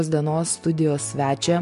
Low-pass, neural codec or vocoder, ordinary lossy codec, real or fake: 10.8 kHz; none; MP3, 64 kbps; real